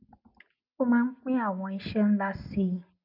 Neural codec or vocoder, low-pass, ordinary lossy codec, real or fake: none; 5.4 kHz; none; real